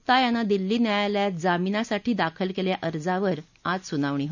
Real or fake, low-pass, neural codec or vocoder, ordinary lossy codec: real; 7.2 kHz; none; none